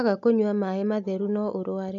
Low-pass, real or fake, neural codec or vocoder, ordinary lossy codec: 7.2 kHz; real; none; none